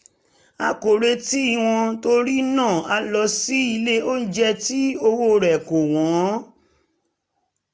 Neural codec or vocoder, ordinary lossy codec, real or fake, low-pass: none; none; real; none